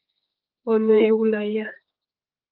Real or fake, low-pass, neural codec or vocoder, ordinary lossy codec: fake; 5.4 kHz; codec, 16 kHz, 4 kbps, X-Codec, HuBERT features, trained on general audio; Opus, 32 kbps